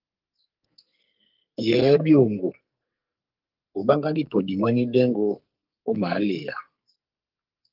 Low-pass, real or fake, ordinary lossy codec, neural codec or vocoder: 5.4 kHz; fake; Opus, 32 kbps; codec, 44.1 kHz, 2.6 kbps, SNAC